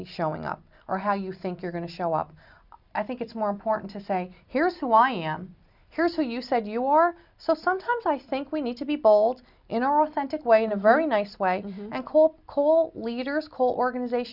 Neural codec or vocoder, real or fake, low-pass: none; real; 5.4 kHz